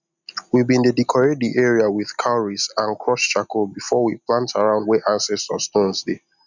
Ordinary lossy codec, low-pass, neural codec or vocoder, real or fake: none; 7.2 kHz; none; real